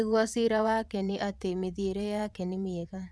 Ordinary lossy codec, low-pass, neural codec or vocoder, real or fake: none; none; vocoder, 22.05 kHz, 80 mel bands, WaveNeXt; fake